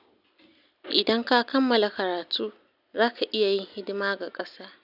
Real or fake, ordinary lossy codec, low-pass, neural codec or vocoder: real; none; 5.4 kHz; none